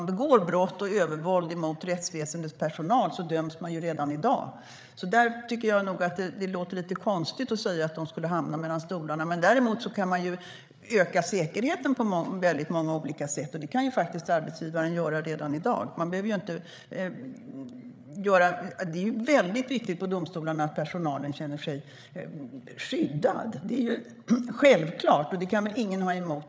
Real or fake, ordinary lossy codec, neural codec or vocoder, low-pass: fake; none; codec, 16 kHz, 8 kbps, FreqCodec, larger model; none